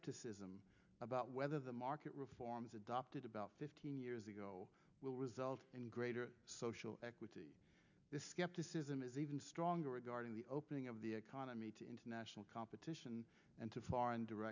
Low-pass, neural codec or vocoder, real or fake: 7.2 kHz; none; real